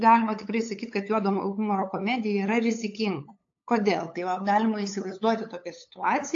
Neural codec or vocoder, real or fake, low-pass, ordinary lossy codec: codec, 16 kHz, 8 kbps, FunCodec, trained on LibriTTS, 25 frames a second; fake; 7.2 kHz; AAC, 48 kbps